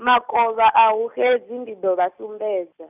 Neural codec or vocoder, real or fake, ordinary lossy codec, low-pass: none; real; none; 3.6 kHz